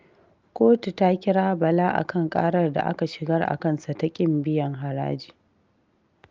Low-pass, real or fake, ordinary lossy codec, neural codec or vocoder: 7.2 kHz; real; Opus, 24 kbps; none